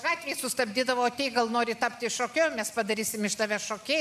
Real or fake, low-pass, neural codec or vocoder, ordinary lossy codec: real; 14.4 kHz; none; MP3, 96 kbps